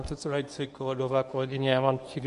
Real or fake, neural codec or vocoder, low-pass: fake; codec, 24 kHz, 0.9 kbps, WavTokenizer, medium speech release version 1; 10.8 kHz